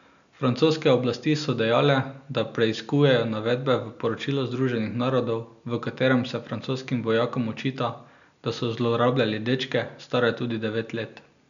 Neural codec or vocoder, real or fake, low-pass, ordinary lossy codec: none; real; 7.2 kHz; none